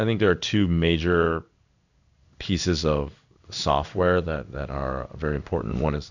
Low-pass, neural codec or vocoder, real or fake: 7.2 kHz; codec, 16 kHz in and 24 kHz out, 1 kbps, XY-Tokenizer; fake